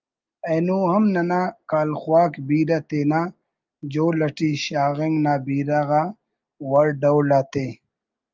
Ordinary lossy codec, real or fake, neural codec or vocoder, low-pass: Opus, 24 kbps; real; none; 7.2 kHz